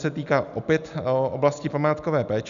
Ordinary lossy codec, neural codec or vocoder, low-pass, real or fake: MP3, 96 kbps; none; 7.2 kHz; real